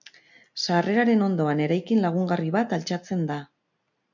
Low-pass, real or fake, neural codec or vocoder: 7.2 kHz; real; none